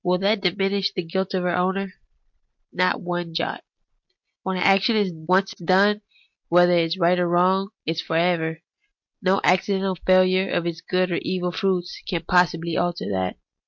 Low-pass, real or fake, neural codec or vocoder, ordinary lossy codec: 7.2 kHz; real; none; MP3, 48 kbps